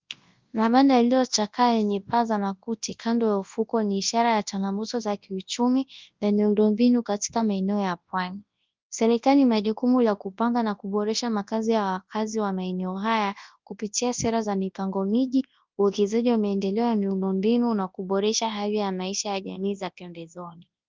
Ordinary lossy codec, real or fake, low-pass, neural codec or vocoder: Opus, 32 kbps; fake; 7.2 kHz; codec, 24 kHz, 0.9 kbps, WavTokenizer, large speech release